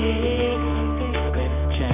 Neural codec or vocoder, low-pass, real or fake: codec, 24 kHz, 0.9 kbps, WavTokenizer, medium music audio release; 3.6 kHz; fake